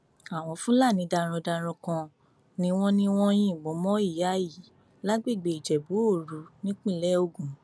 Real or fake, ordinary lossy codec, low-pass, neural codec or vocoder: real; none; none; none